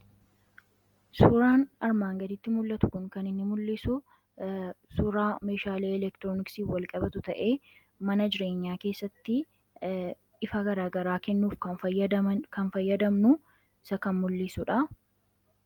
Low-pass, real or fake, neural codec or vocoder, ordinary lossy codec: 19.8 kHz; real; none; Opus, 32 kbps